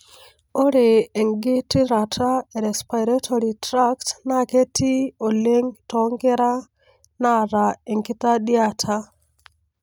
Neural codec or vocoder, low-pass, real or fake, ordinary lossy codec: none; none; real; none